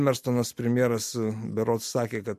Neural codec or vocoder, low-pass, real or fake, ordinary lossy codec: none; 14.4 kHz; real; MP3, 64 kbps